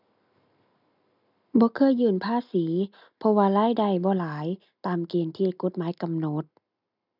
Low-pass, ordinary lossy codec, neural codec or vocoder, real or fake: 5.4 kHz; none; none; real